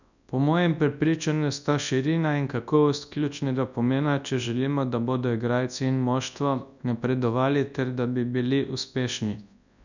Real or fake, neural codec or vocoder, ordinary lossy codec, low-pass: fake; codec, 24 kHz, 0.9 kbps, WavTokenizer, large speech release; none; 7.2 kHz